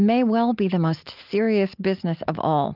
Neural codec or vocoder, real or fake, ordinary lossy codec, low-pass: none; real; Opus, 24 kbps; 5.4 kHz